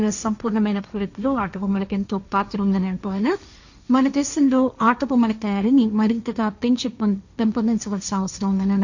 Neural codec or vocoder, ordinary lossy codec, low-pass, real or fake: codec, 16 kHz, 1.1 kbps, Voila-Tokenizer; none; 7.2 kHz; fake